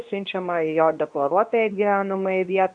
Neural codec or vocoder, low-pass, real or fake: codec, 24 kHz, 0.9 kbps, WavTokenizer, medium speech release version 2; 9.9 kHz; fake